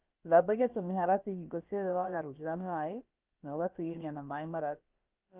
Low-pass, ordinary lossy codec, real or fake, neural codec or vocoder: 3.6 kHz; Opus, 24 kbps; fake; codec, 16 kHz, about 1 kbps, DyCAST, with the encoder's durations